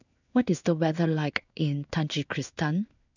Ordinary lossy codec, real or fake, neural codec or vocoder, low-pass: none; fake; codec, 16 kHz in and 24 kHz out, 1 kbps, XY-Tokenizer; 7.2 kHz